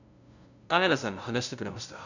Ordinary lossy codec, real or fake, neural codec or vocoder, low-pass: none; fake; codec, 16 kHz, 0.5 kbps, FunCodec, trained on LibriTTS, 25 frames a second; 7.2 kHz